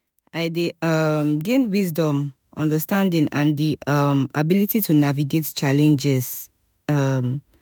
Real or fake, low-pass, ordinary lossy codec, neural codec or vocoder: fake; none; none; autoencoder, 48 kHz, 32 numbers a frame, DAC-VAE, trained on Japanese speech